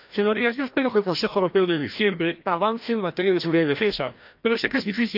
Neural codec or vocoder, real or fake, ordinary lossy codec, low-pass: codec, 16 kHz, 1 kbps, FreqCodec, larger model; fake; none; 5.4 kHz